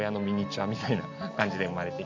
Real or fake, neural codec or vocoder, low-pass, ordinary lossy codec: real; none; 7.2 kHz; AAC, 48 kbps